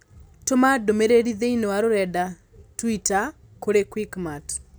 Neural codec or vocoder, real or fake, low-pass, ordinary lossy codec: none; real; none; none